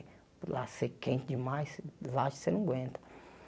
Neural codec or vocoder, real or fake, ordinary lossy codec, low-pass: none; real; none; none